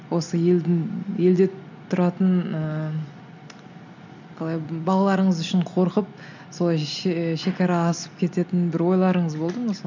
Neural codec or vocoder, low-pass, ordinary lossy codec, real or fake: none; 7.2 kHz; none; real